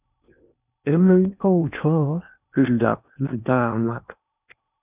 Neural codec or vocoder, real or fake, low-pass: codec, 16 kHz in and 24 kHz out, 0.8 kbps, FocalCodec, streaming, 65536 codes; fake; 3.6 kHz